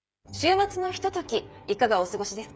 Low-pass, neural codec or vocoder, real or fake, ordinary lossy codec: none; codec, 16 kHz, 8 kbps, FreqCodec, smaller model; fake; none